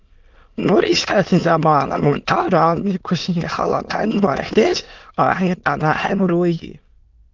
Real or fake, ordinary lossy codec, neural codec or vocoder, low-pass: fake; Opus, 24 kbps; autoencoder, 22.05 kHz, a latent of 192 numbers a frame, VITS, trained on many speakers; 7.2 kHz